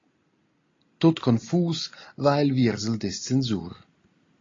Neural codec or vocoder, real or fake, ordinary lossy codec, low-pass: none; real; AAC, 32 kbps; 7.2 kHz